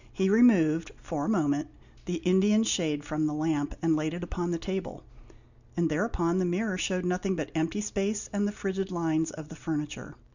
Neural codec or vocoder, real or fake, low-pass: none; real; 7.2 kHz